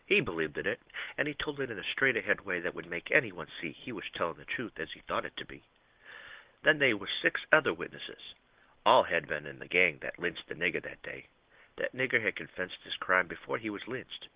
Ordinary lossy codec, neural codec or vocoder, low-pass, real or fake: Opus, 16 kbps; none; 3.6 kHz; real